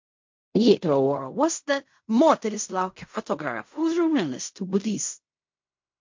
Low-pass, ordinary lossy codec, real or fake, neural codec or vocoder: 7.2 kHz; MP3, 48 kbps; fake; codec, 16 kHz in and 24 kHz out, 0.4 kbps, LongCat-Audio-Codec, fine tuned four codebook decoder